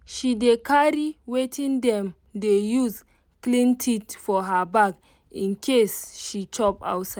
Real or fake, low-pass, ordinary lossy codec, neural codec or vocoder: real; none; none; none